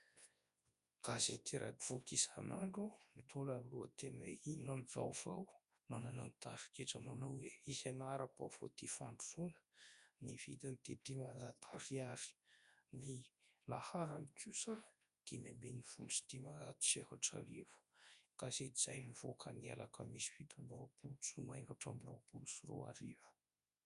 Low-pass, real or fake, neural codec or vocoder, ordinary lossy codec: 10.8 kHz; fake; codec, 24 kHz, 0.9 kbps, WavTokenizer, large speech release; Opus, 64 kbps